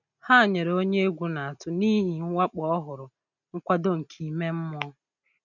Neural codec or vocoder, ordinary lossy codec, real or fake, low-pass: none; none; real; 7.2 kHz